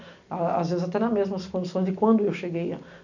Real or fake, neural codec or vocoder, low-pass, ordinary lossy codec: real; none; 7.2 kHz; none